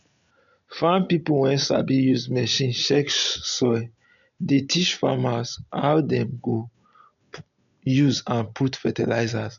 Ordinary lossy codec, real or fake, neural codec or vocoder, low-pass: none; real; none; 7.2 kHz